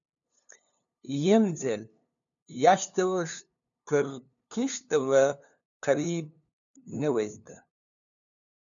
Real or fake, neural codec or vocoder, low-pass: fake; codec, 16 kHz, 2 kbps, FunCodec, trained on LibriTTS, 25 frames a second; 7.2 kHz